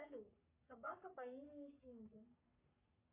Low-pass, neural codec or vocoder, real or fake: 3.6 kHz; codec, 44.1 kHz, 3.4 kbps, Pupu-Codec; fake